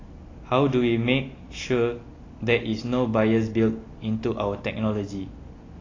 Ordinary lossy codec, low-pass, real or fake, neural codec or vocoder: AAC, 32 kbps; 7.2 kHz; real; none